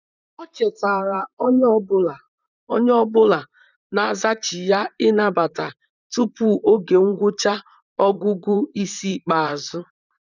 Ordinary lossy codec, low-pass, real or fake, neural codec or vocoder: none; 7.2 kHz; fake; vocoder, 44.1 kHz, 128 mel bands, Pupu-Vocoder